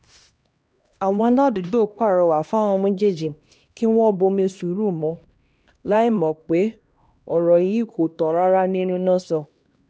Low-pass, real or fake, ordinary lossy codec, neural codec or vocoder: none; fake; none; codec, 16 kHz, 1 kbps, X-Codec, HuBERT features, trained on LibriSpeech